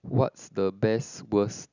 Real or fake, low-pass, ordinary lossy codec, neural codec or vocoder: real; 7.2 kHz; none; none